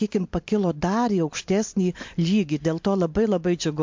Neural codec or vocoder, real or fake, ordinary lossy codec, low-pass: none; real; MP3, 48 kbps; 7.2 kHz